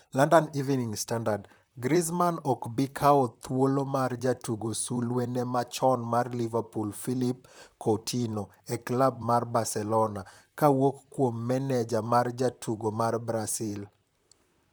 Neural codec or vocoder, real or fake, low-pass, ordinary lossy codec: vocoder, 44.1 kHz, 128 mel bands, Pupu-Vocoder; fake; none; none